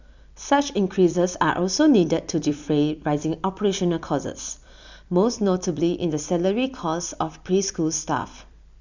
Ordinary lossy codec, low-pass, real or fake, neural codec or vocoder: none; 7.2 kHz; fake; vocoder, 44.1 kHz, 128 mel bands every 256 samples, BigVGAN v2